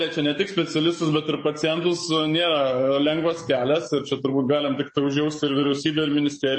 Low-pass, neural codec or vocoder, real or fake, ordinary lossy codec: 9.9 kHz; codec, 44.1 kHz, 7.8 kbps, DAC; fake; MP3, 32 kbps